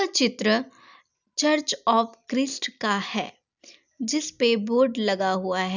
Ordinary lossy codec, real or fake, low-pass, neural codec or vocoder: none; real; 7.2 kHz; none